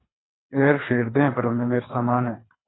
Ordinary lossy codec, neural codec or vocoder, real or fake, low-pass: AAC, 16 kbps; codec, 24 kHz, 3 kbps, HILCodec; fake; 7.2 kHz